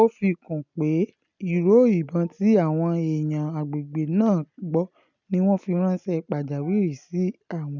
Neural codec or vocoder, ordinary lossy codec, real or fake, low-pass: none; none; real; 7.2 kHz